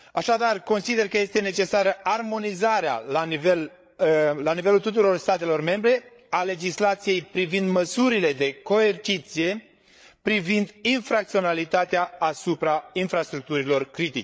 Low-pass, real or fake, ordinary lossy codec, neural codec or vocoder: none; fake; none; codec, 16 kHz, 8 kbps, FreqCodec, larger model